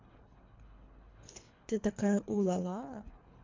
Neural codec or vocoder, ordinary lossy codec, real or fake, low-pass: codec, 24 kHz, 3 kbps, HILCodec; MP3, 64 kbps; fake; 7.2 kHz